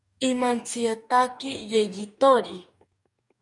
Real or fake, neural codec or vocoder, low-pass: fake; codec, 44.1 kHz, 2.6 kbps, DAC; 10.8 kHz